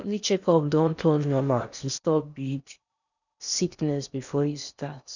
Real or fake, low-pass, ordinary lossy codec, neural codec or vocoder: fake; 7.2 kHz; none; codec, 16 kHz in and 24 kHz out, 0.8 kbps, FocalCodec, streaming, 65536 codes